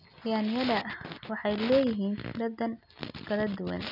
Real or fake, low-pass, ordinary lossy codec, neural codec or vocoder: real; 5.4 kHz; none; none